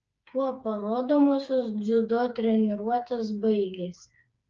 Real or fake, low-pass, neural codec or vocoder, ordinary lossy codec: fake; 7.2 kHz; codec, 16 kHz, 4 kbps, FreqCodec, smaller model; Opus, 24 kbps